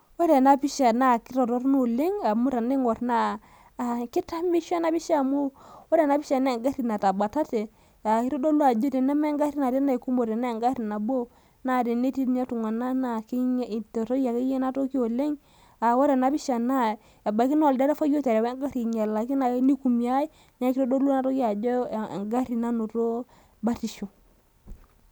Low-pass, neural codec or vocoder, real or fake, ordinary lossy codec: none; none; real; none